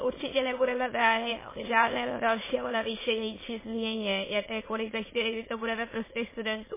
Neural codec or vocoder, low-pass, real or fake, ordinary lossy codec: autoencoder, 22.05 kHz, a latent of 192 numbers a frame, VITS, trained on many speakers; 3.6 kHz; fake; MP3, 16 kbps